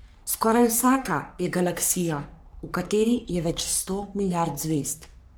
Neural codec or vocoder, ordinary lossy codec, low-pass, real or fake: codec, 44.1 kHz, 3.4 kbps, Pupu-Codec; none; none; fake